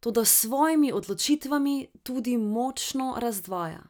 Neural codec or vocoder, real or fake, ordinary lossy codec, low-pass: none; real; none; none